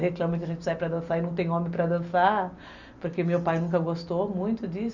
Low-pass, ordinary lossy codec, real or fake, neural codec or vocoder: 7.2 kHz; none; real; none